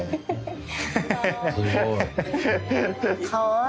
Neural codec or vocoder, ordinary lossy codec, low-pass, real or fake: none; none; none; real